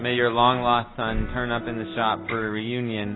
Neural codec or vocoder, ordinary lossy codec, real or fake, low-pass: none; AAC, 16 kbps; real; 7.2 kHz